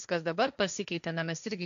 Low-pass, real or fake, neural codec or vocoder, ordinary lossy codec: 7.2 kHz; fake; codec, 16 kHz, 1.1 kbps, Voila-Tokenizer; MP3, 96 kbps